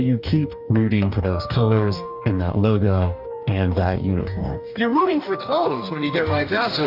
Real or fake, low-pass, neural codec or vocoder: fake; 5.4 kHz; codec, 44.1 kHz, 2.6 kbps, DAC